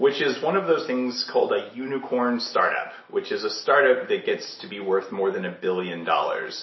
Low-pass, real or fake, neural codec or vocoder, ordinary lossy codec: 7.2 kHz; real; none; MP3, 24 kbps